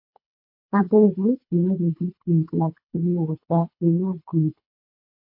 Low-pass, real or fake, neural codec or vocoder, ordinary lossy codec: 5.4 kHz; fake; codec, 24 kHz, 3 kbps, HILCodec; MP3, 32 kbps